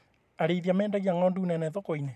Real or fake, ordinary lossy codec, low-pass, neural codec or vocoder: fake; none; 14.4 kHz; vocoder, 44.1 kHz, 128 mel bands every 512 samples, BigVGAN v2